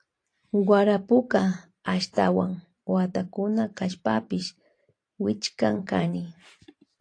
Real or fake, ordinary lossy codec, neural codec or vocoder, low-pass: fake; AAC, 48 kbps; vocoder, 44.1 kHz, 128 mel bands every 512 samples, BigVGAN v2; 9.9 kHz